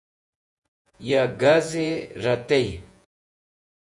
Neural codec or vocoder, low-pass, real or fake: vocoder, 48 kHz, 128 mel bands, Vocos; 10.8 kHz; fake